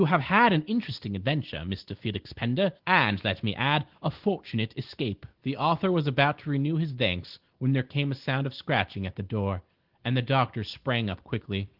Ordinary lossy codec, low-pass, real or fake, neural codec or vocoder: Opus, 16 kbps; 5.4 kHz; real; none